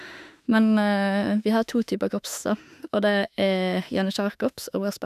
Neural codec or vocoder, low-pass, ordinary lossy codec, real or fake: autoencoder, 48 kHz, 32 numbers a frame, DAC-VAE, trained on Japanese speech; 14.4 kHz; none; fake